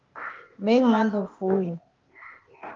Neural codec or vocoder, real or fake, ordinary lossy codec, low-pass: codec, 16 kHz, 0.8 kbps, ZipCodec; fake; Opus, 32 kbps; 7.2 kHz